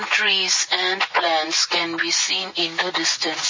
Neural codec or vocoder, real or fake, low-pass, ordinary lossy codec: none; real; 7.2 kHz; MP3, 32 kbps